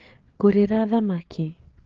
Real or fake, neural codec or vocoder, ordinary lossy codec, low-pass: fake; codec, 16 kHz, 4 kbps, FreqCodec, larger model; Opus, 16 kbps; 7.2 kHz